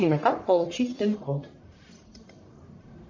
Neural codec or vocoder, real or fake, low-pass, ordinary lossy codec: codec, 44.1 kHz, 1.7 kbps, Pupu-Codec; fake; 7.2 kHz; MP3, 48 kbps